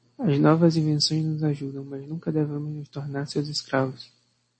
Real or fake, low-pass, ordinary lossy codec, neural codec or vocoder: real; 9.9 kHz; MP3, 32 kbps; none